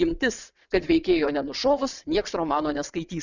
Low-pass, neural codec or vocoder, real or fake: 7.2 kHz; vocoder, 22.05 kHz, 80 mel bands, WaveNeXt; fake